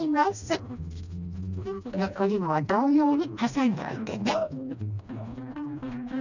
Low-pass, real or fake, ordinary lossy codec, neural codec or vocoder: 7.2 kHz; fake; none; codec, 16 kHz, 1 kbps, FreqCodec, smaller model